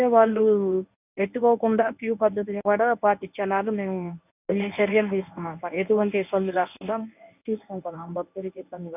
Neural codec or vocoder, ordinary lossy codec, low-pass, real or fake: codec, 24 kHz, 0.9 kbps, WavTokenizer, medium speech release version 1; none; 3.6 kHz; fake